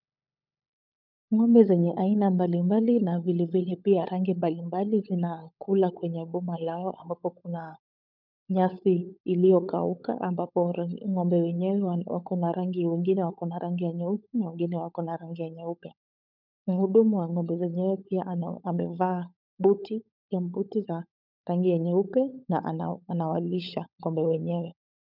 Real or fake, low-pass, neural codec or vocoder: fake; 5.4 kHz; codec, 16 kHz, 16 kbps, FunCodec, trained on LibriTTS, 50 frames a second